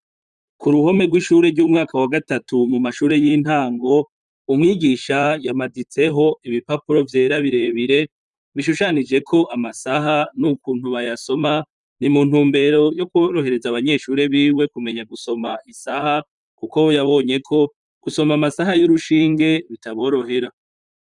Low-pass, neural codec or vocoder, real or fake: 10.8 kHz; vocoder, 44.1 kHz, 128 mel bands, Pupu-Vocoder; fake